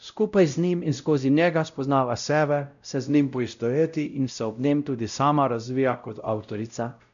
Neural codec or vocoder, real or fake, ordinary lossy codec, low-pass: codec, 16 kHz, 0.5 kbps, X-Codec, WavLM features, trained on Multilingual LibriSpeech; fake; none; 7.2 kHz